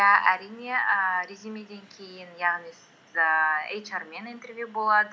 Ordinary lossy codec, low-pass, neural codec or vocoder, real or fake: none; none; none; real